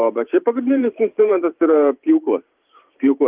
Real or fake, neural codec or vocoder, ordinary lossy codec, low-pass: fake; vocoder, 44.1 kHz, 128 mel bands every 512 samples, BigVGAN v2; Opus, 32 kbps; 3.6 kHz